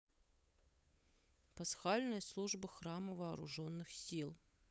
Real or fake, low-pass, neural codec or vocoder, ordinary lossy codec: fake; none; codec, 16 kHz, 8 kbps, FunCodec, trained on Chinese and English, 25 frames a second; none